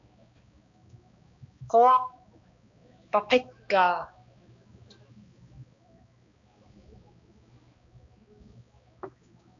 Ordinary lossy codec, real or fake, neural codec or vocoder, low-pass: AAC, 64 kbps; fake; codec, 16 kHz, 2 kbps, X-Codec, HuBERT features, trained on balanced general audio; 7.2 kHz